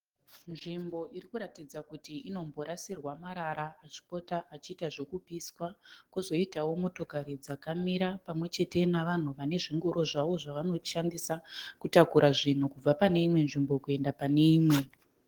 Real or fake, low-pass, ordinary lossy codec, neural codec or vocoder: fake; 19.8 kHz; Opus, 16 kbps; codec, 44.1 kHz, 7.8 kbps, DAC